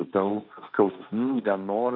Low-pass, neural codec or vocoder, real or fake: 5.4 kHz; codec, 16 kHz, 1.1 kbps, Voila-Tokenizer; fake